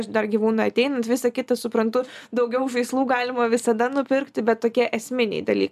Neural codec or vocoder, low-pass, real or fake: none; 14.4 kHz; real